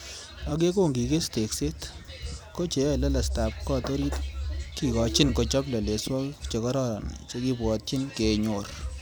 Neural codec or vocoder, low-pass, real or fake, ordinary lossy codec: vocoder, 44.1 kHz, 128 mel bands every 256 samples, BigVGAN v2; none; fake; none